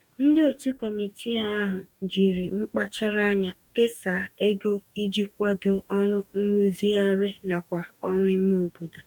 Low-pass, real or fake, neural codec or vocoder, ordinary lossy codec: 19.8 kHz; fake; codec, 44.1 kHz, 2.6 kbps, DAC; none